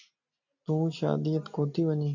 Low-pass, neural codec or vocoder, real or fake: 7.2 kHz; none; real